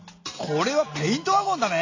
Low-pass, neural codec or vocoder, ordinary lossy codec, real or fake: 7.2 kHz; none; AAC, 48 kbps; real